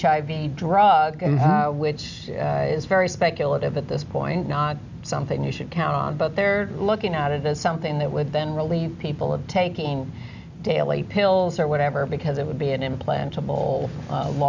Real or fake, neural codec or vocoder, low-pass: real; none; 7.2 kHz